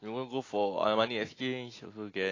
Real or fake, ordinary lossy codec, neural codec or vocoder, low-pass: real; AAC, 32 kbps; none; 7.2 kHz